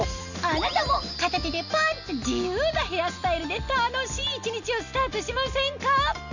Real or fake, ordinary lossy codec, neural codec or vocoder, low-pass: real; none; none; 7.2 kHz